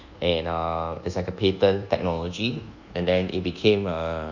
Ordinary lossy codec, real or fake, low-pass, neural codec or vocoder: none; fake; 7.2 kHz; codec, 24 kHz, 1.2 kbps, DualCodec